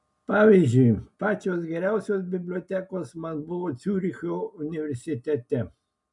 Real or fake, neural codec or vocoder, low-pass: real; none; 10.8 kHz